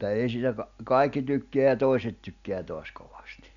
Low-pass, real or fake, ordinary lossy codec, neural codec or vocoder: 7.2 kHz; real; none; none